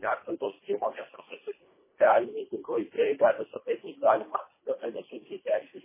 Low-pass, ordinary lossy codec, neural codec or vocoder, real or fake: 3.6 kHz; MP3, 16 kbps; codec, 24 kHz, 1.5 kbps, HILCodec; fake